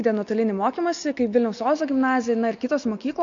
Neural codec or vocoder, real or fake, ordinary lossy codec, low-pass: none; real; MP3, 48 kbps; 7.2 kHz